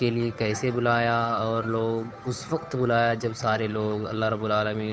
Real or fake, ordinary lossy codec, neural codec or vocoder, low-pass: fake; none; codec, 16 kHz, 8 kbps, FunCodec, trained on Chinese and English, 25 frames a second; none